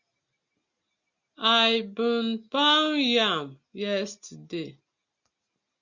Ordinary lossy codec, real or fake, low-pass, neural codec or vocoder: Opus, 64 kbps; real; 7.2 kHz; none